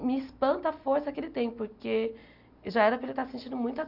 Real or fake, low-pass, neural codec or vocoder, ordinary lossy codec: real; 5.4 kHz; none; none